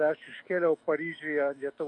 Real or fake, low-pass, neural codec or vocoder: fake; 10.8 kHz; autoencoder, 48 kHz, 128 numbers a frame, DAC-VAE, trained on Japanese speech